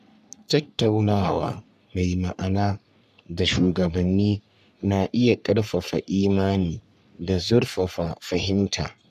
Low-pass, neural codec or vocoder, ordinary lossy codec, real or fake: 14.4 kHz; codec, 44.1 kHz, 3.4 kbps, Pupu-Codec; none; fake